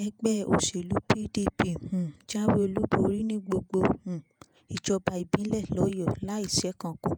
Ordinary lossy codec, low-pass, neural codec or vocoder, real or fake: none; none; vocoder, 48 kHz, 128 mel bands, Vocos; fake